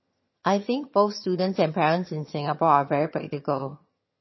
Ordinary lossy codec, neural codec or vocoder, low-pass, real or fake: MP3, 24 kbps; vocoder, 22.05 kHz, 80 mel bands, HiFi-GAN; 7.2 kHz; fake